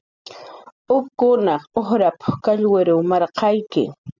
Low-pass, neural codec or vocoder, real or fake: 7.2 kHz; none; real